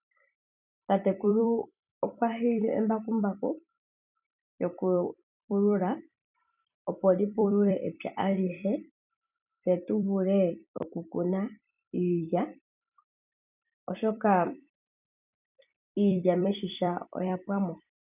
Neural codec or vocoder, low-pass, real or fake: vocoder, 44.1 kHz, 128 mel bands every 256 samples, BigVGAN v2; 3.6 kHz; fake